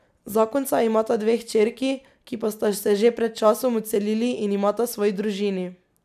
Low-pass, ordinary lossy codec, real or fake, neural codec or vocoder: 14.4 kHz; none; real; none